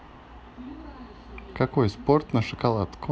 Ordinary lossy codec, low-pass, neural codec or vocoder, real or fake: none; none; none; real